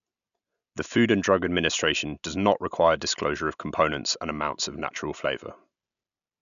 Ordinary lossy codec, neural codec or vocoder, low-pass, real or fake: none; none; 7.2 kHz; real